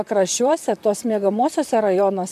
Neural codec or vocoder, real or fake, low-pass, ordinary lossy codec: vocoder, 44.1 kHz, 128 mel bands, Pupu-Vocoder; fake; 14.4 kHz; MP3, 96 kbps